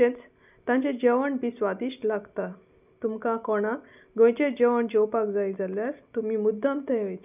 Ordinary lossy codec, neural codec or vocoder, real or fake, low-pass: none; none; real; 3.6 kHz